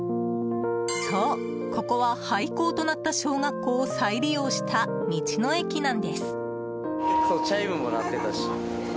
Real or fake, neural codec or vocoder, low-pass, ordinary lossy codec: real; none; none; none